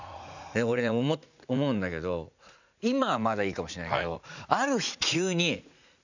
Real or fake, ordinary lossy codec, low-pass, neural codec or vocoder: fake; none; 7.2 kHz; vocoder, 44.1 kHz, 80 mel bands, Vocos